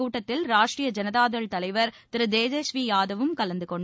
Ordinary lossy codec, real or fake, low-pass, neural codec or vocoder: none; real; none; none